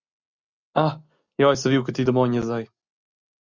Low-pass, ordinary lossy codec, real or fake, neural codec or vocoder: 7.2 kHz; Opus, 64 kbps; real; none